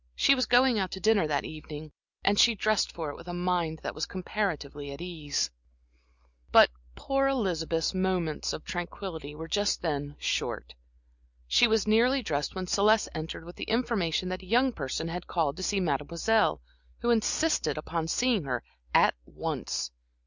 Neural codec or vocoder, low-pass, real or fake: none; 7.2 kHz; real